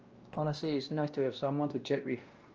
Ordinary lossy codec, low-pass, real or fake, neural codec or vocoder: Opus, 24 kbps; 7.2 kHz; fake; codec, 16 kHz, 1 kbps, X-Codec, WavLM features, trained on Multilingual LibriSpeech